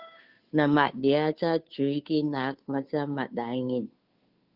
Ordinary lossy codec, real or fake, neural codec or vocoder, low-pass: Opus, 32 kbps; fake; codec, 16 kHz, 2 kbps, FunCodec, trained on Chinese and English, 25 frames a second; 5.4 kHz